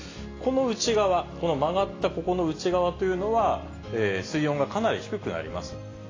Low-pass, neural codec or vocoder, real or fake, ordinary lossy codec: 7.2 kHz; none; real; AAC, 32 kbps